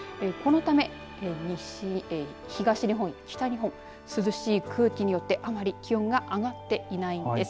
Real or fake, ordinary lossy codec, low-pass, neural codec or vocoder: real; none; none; none